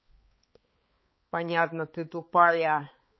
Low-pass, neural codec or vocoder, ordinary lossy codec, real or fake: 7.2 kHz; codec, 16 kHz, 4 kbps, X-Codec, HuBERT features, trained on balanced general audio; MP3, 24 kbps; fake